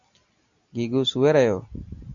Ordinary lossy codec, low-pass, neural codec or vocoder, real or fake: MP3, 96 kbps; 7.2 kHz; none; real